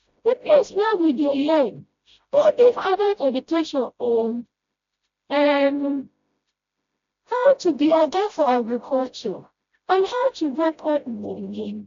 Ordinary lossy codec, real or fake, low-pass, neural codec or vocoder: MP3, 64 kbps; fake; 7.2 kHz; codec, 16 kHz, 0.5 kbps, FreqCodec, smaller model